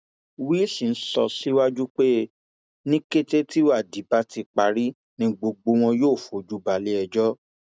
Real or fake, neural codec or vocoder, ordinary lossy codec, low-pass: real; none; none; none